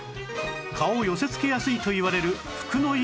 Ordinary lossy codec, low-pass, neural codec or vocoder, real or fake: none; none; none; real